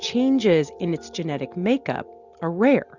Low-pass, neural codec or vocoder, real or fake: 7.2 kHz; none; real